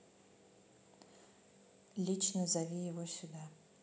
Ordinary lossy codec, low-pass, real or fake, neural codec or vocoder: none; none; real; none